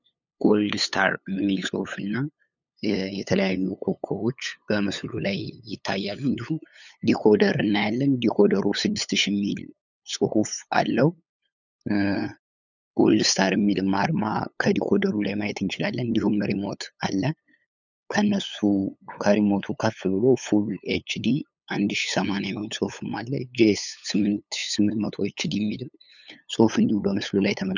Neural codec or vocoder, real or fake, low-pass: codec, 16 kHz, 8 kbps, FunCodec, trained on LibriTTS, 25 frames a second; fake; 7.2 kHz